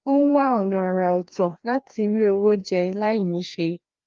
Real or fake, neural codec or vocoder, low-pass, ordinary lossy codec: fake; codec, 16 kHz, 1 kbps, FreqCodec, larger model; 7.2 kHz; Opus, 32 kbps